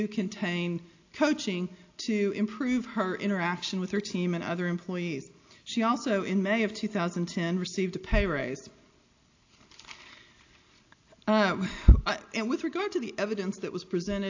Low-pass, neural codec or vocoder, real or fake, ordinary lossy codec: 7.2 kHz; none; real; AAC, 48 kbps